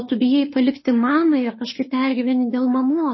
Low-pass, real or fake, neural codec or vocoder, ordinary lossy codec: 7.2 kHz; fake; autoencoder, 48 kHz, 32 numbers a frame, DAC-VAE, trained on Japanese speech; MP3, 24 kbps